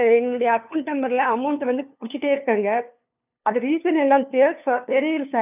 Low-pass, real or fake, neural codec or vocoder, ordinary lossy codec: 3.6 kHz; fake; codec, 16 kHz, 2 kbps, FunCodec, trained on LibriTTS, 25 frames a second; none